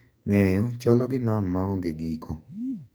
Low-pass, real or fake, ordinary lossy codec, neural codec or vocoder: none; fake; none; codec, 44.1 kHz, 2.6 kbps, SNAC